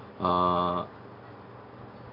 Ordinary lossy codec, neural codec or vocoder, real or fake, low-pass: none; none; real; 5.4 kHz